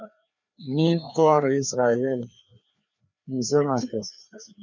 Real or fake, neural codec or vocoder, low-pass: fake; codec, 16 kHz, 2 kbps, FreqCodec, larger model; 7.2 kHz